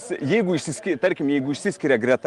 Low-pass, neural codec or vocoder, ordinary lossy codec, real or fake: 10.8 kHz; none; Opus, 24 kbps; real